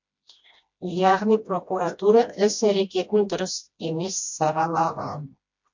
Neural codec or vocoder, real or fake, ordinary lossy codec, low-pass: codec, 16 kHz, 1 kbps, FreqCodec, smaller model; fake; MP3, 48 kbps; 7.2 kHz